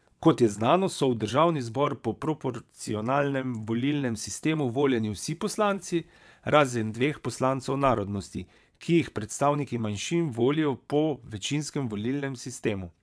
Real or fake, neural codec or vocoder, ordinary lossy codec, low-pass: fake; vocoder, 22.05 kHz, 80 mel bands, WaveNeXt; none; none